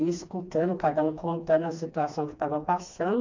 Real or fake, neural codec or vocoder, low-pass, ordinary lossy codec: fake; codec, 16 kHz, 2 kbps, FreqCodec, smaller model; 7.2 kHz; MP3, 64 kbps